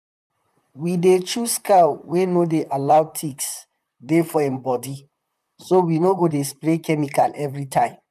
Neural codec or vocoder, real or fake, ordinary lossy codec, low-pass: vocoder, 44.1 kHz, 128 mel bands, Pupu-Vocoder; fake; none; 14.4 kHz